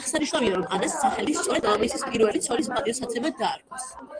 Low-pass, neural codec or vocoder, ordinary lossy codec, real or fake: 9.9 kHz; none; Opus, 24 kbps; real